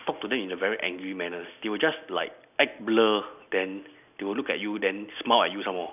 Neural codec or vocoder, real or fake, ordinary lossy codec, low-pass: none; real; none; 3.6 kHz